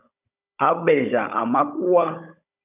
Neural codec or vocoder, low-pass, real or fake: codec, 16 kHz, 16 kbps, FunCodec, trained on Chinese and English, 50 frames a second; 3.6 kHz; fake